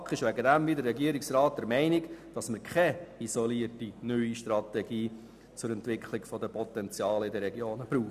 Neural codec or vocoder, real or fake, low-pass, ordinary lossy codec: none; real; 14.4 kHz; none